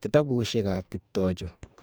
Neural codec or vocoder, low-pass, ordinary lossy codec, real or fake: codec, 44.1 kHz, 2.6 kbps, DAC; none; none; fake